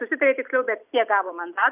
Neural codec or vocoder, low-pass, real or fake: none; 3.6 kHz; real